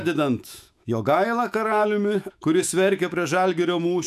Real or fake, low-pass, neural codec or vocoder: fake; 14.4 kHz; autoencoder, 48 kHz, 128 numbers a frame, DAC-VAE, trained on Japanese speech